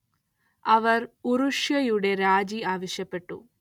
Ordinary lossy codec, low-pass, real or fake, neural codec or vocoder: none; 19.8 kHz; real; none